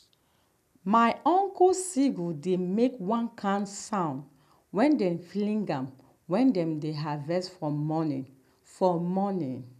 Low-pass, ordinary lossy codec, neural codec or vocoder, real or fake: 14.4 kHz; none; none; real